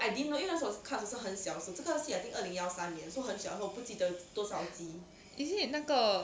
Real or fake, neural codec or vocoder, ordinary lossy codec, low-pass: real; none; none; none